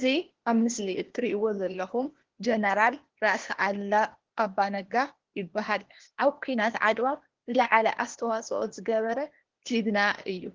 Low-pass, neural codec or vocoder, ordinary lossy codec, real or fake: 7.2 kHz; codec, 16 kHz, 0.8 kbps, ZipCodec; Opus, 16 kbps; fake